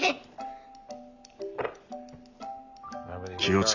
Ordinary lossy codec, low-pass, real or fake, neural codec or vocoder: none; 7.2 kHz; real; none